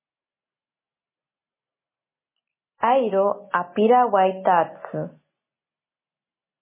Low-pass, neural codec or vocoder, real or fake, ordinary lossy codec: 3.6 kHz; none; real; MP3, 16 kbps